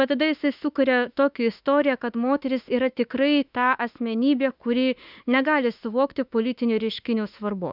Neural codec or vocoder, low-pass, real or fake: autoencoder, 48 kHz, 32 numbers a frame, DAC-VAE, trained on Japanese speech; 5.4 kHz; fake